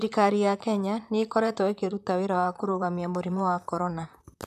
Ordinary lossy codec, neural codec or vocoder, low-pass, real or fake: none; vocoder, 44.1 kHz, 128 mel bands, Pupu-Vocoder; 14.4 kHz; fake